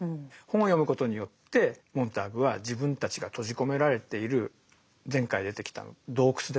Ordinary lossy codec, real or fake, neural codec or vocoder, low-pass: none; real; none; none